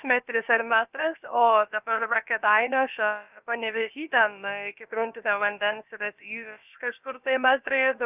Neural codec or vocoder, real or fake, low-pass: codec, 16 kHz, about 1 kbps, DyCAST, with the encoder's durations; fake; 3.6 kHz